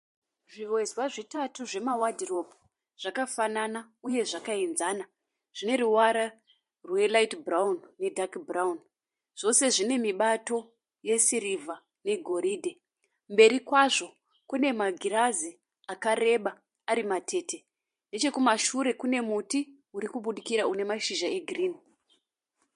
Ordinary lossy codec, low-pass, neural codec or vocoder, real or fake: MP3, 48 kbps; 14.4 kHz; vocoder, 44.1 kHz, 128 mel bands every 512 samples, BigVGAN v2; fake